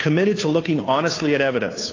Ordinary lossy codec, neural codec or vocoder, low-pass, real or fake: AAC, 32 kbps; codec, 16 kHz, 2 kbps, FunCodec, trained on Chinese and English, 25 frames a second; 7.2 kHz; fake